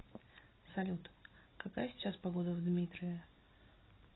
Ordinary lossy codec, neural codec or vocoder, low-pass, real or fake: AAC, 16 kbps; none; 7.2 kHz; real